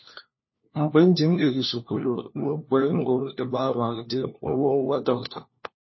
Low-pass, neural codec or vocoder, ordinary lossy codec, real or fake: 7.2 kHz; codec, 16 kHz, 1 kbps, FunCodec, trained on LibriTTS, 50 frames a second; MP3, 24 kbps; fake